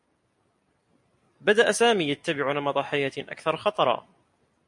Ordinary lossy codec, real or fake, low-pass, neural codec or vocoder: MP3, 48 kbps; real; 10.8 kHz; none